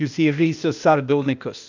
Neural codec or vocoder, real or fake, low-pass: codec, 16 kHz, 0.8 kbps, ZipCodec; fake; 7.2 kHz